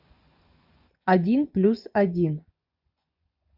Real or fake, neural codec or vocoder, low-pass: fake; vocoder, 24 kHz, 100 mel bands, Vocos; 5.4 kHz